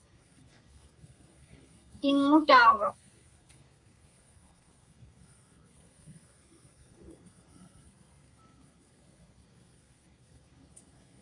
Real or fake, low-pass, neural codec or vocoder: fake; 10.8 kHz; codec, 32 kHz, 1.9 kbps, SNAC